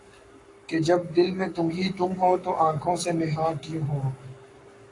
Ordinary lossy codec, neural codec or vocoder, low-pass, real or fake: AAC, 48 kbps; codec, 44.1 kHz, 7.8 kbps, Pupu-Codec; 10.8 kHz; fake